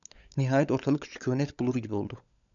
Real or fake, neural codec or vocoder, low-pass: fake; codec, 16 kHz, 16 kbps, FunCodec, trained on LibriTTS, 50 frames a second; 7.2 kHz